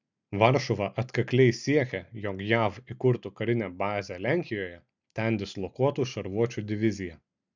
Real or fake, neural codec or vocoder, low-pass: real; none; 7.2 kHz